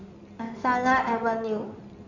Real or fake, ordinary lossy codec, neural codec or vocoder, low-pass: fake; none; codec, 16 kHz in and 24 kHz out, 2.2 kbps, FireRedTTS-2 codec; 7.2 kHz